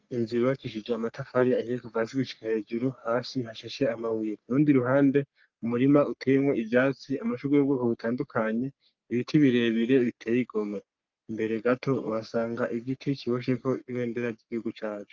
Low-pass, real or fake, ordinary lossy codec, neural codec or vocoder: 7.2 kHz; fake; Opus, 24 kbps; codec, 44.1 kHz, 3.4 kbps, Pupu-Codec